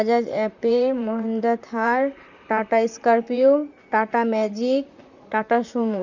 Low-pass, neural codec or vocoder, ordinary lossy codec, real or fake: 7.2 kHz; vocoder, 44.1 kHz, 128 mel bands, Pupu-Vocoder; none; fake